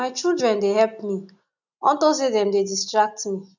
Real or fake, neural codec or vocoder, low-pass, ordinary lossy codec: real; none; 7.2 kHz; none